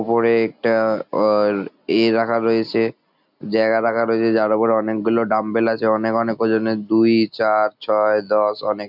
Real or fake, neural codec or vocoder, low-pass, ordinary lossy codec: real; none; 5.4 kHz; none